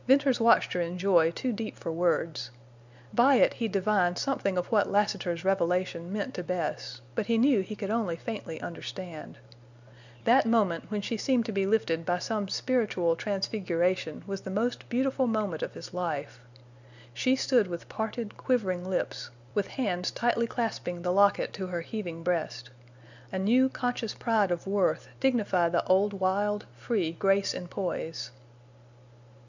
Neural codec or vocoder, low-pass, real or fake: none; 7.2 kHz; real